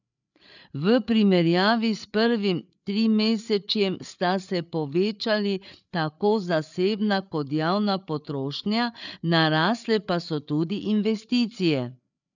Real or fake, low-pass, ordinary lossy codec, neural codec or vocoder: fake; 7.2 kHz; none; codec, 16 kHz, 8 kbps, FreqCodec, larger model